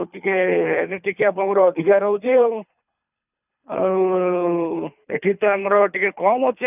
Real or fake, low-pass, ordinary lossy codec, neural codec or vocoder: fake; 3.6 kHz; none; codec, 24 kHz, 3 kbps, HILCodec